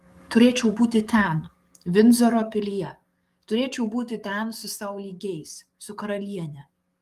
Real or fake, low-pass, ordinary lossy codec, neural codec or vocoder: fake; 14.4 kHz; Opus, 32 kbps; codec, 44.1 kHz, 7.8 kbps, DAC